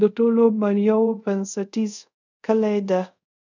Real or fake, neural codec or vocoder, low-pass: fake; codec, 24 kHz, 0.5 kbps, DualCodec; 7.2 kHz